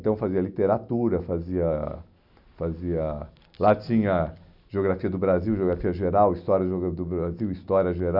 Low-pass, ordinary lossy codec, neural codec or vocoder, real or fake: 5.4 kHz; none; none; real